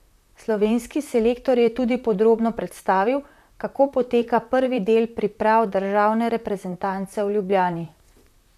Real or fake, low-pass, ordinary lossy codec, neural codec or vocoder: fake; 14.4 kHz; none; vocoder, 44.1 kHz, 128 mel bands, Pupu-Vocoder